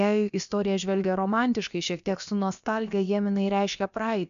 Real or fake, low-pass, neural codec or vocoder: fake; 7.2 kHz; codec, 16 kHz, about 1 kbps, DyCAST, with the encoder's durations